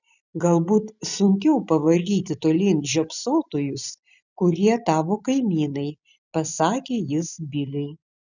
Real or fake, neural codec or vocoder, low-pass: fake; vocoder, 44.1 kHz, 128 mel bands every 256 samples, BigVGAN v2; 7.2 kHz